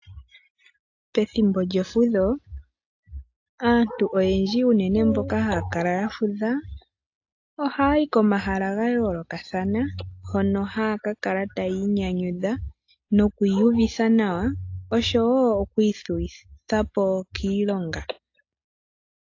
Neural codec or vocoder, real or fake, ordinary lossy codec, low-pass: none; real; AAC, 48 kbps; 7.2 kHz